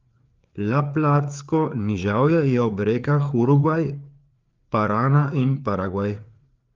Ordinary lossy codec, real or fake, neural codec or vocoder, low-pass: Opus, 32 kbps; fake; codec, 16 kHz, 4 kbps, FreqCodec, larger model; 7.2 kHz